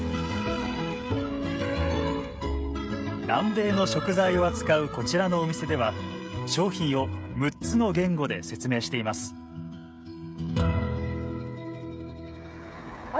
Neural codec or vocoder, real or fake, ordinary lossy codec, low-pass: codec, 16 kHz, 16 kbps, FreqCodec, smaller model; fake; none; none